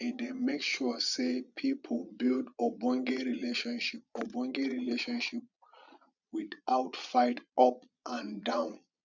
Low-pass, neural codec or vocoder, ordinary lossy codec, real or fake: 7.2 kHz; vocoder, 22.05 kHz, 80 mel bands, Vocos; none; fake